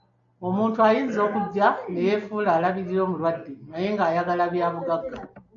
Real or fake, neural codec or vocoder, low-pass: real; none; 7.2 kHz